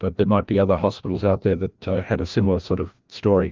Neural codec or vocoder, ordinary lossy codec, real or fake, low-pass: codec, 16 kHz, 1 kbps, FreqCodec, larger model; Opus, 32 kbps; fake; 7.2 kHz